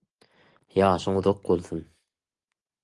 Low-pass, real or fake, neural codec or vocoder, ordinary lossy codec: 10.8 kHz; real; none; Opus, 24 kbps